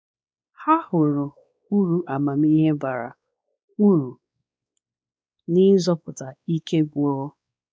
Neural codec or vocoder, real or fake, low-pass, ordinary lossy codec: codec, 16 kHz, 2 kbps, X-Codec, WavLM features, trained on Multilingual LibriSpeech; fake; none; none